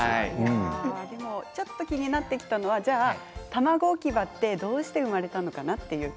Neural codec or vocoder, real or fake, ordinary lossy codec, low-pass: none; real; none; none